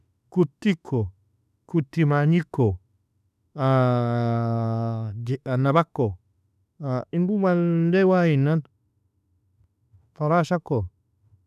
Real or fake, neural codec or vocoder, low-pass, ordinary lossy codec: fake; autoencoder, 48 kHz, 32 numbers a frame, DAC-VAE, trained on Japanese speech; 14.4 kHz; none